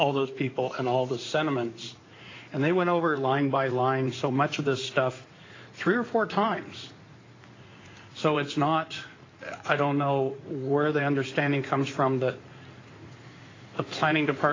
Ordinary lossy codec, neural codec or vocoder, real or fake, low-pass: AAC, 32 kbps; codec, 16 kHz in and 24 kHz out, 2.2 kbps, FireRedTTS-2 codec; fake; 7.2 kHz